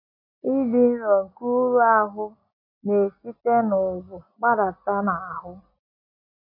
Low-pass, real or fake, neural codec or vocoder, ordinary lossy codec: 5.4 kHz; real; none; MP3, 32 kbps